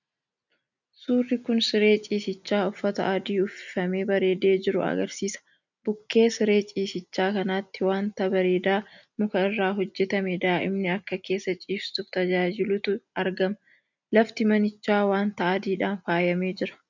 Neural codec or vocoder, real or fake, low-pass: none; real; 7.2 kHz